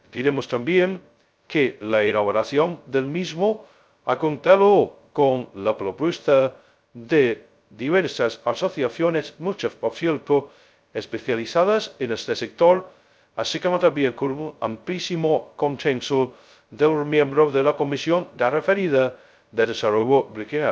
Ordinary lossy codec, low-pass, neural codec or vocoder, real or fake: none; none; codec, 16 kHz, 0.2 kbps, FocalCodec; fake